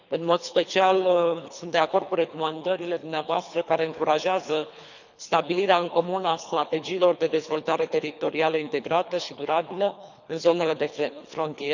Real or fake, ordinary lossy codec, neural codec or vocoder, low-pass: fake; none; codec, 24 kHz, 3 kbps, HILCodec; 7.2 kHz